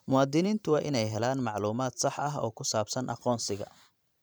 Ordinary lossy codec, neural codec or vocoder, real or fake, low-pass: none; vocoder, 44.1 kHz, 128 mel bands every 512 samples, BigVGAN v2; fake; none